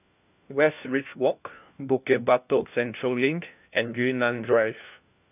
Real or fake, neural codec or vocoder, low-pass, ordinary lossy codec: fake; codec, 16 kHz, 1 kbps, FunCodec, trained on LibriTTS, 50 frames a second; 3.6 kHz; none